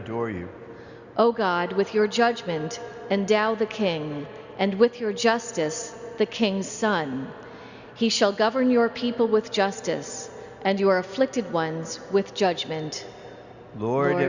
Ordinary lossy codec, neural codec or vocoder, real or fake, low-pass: Opus, 64 kbps; none; real; 7.2 kHz